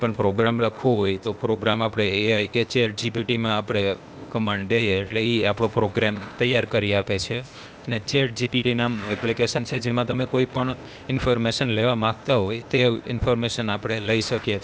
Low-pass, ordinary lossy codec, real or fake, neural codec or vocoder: none; none; fake; codec, 16 kHz, 0.8 kbps, ZipCodec